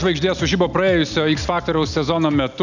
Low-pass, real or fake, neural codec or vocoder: 7.2 kHz; real; none